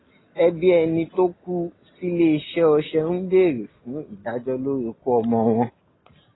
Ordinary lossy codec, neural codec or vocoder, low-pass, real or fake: AAC, 16 kbps; none; 7.2 kHz; real